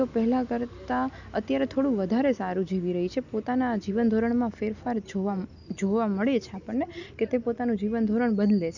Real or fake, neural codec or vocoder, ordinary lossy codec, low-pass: real; none; none; 7.2 kHz